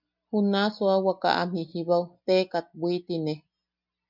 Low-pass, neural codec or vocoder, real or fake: 5.4 kHz; none; real